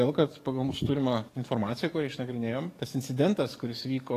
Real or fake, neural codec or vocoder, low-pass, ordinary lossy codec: fake; codec, 44.1 kHz, 7.8 kbps, DAC; 14.4 kHz; AAC, 48 kbps